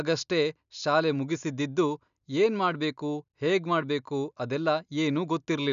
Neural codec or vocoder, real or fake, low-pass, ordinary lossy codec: none; real; 7.2 kHz; none